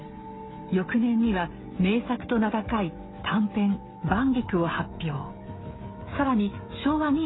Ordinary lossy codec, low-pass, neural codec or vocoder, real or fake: AAC, 16 kbps; 7.2 kHz; codec, 16 kHz, 16 kbps, FreqCodec, smaller model; fake